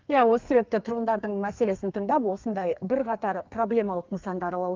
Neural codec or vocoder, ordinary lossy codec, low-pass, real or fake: codec, 32 kHz, 1.9 kbps, SNAC; Opus, 24 kbps; 7.2 kHz; fake